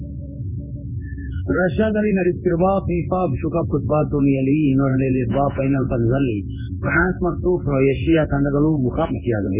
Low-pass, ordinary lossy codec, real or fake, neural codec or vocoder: 3.6 kHz; none; fake; codec, 16 kHz in and 24 kHz out, 1 kbps, XY-Tokenizer